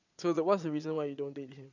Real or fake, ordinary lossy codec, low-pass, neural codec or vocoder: fake; none; 7.2 kHz; vocoder, 22.05 kHz, 80 mel bands, Vocos